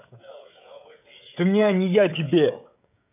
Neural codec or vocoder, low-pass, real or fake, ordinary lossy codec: codec, 16 kHz, 16 kbps, FreqCodec, smaller model; 3.6 kHz; fake; none